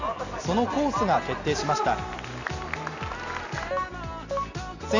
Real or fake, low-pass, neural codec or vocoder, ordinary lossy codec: real; 7.2 kHz; none; none